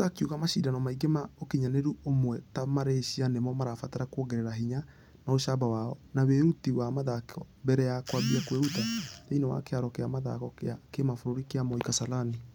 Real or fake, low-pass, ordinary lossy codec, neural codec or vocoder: real; none; none; none